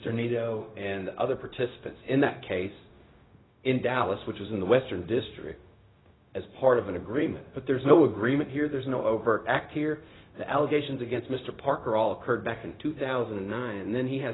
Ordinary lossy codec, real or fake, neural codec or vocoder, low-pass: AAC, 16 kbps; fake; codec, 16 kHz, 0.4 kbps, LongCat-Audio-Codec; 7.2 kHz